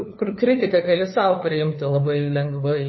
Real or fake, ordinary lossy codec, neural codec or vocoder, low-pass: fake; MP3, 24 kbps; codec, 16 kHz, 2 kbps, FunCodec, trained on LibriTTS, 25 frames a second; 7.2 kHz